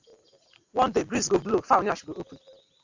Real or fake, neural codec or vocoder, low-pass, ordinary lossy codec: real; none; 7.2 kHz; AAC, 48 kbps